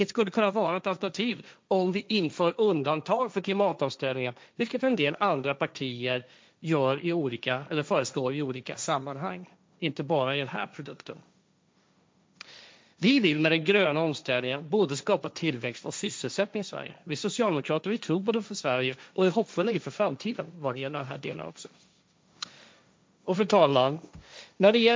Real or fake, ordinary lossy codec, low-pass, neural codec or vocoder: fake; none; none; codec, 16 kHz, 1.1 kbps, Voila-Tokenizer